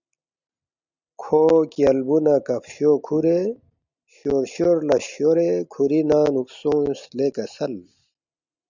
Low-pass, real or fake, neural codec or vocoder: 7.2 kHz; real; none